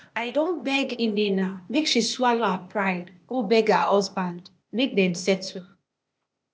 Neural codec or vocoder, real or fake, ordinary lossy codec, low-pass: codec, 16 kHz, 0.8 kbps, ZipCodec; fake; none; none